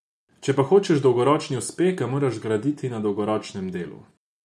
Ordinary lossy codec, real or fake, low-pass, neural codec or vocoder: none; real; none; none